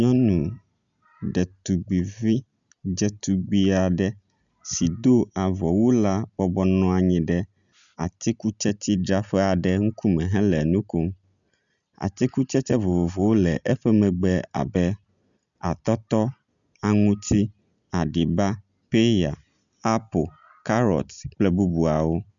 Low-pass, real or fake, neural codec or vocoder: 7.2 kHz; real; none